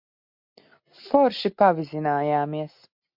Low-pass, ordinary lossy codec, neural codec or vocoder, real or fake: 5.4 kHz; MP3, 48 kbps; none; real